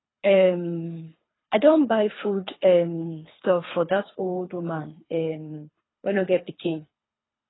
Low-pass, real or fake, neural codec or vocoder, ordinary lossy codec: 7.2 kHz; fake; codec, 24 kHz, 3 kbps, HILCodec; AAC, 16 kbps